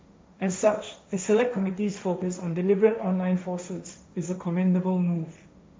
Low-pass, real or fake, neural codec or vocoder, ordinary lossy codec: none; fake; codec, 16 kHz, 1.1 kbps, Voila-Tokenizer; none